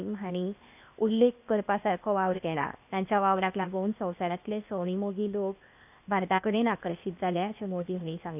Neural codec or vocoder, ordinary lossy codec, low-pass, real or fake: codec, 16 kHz, 0.8 kbps, ZipCodec; none; 3.6 kHz; fake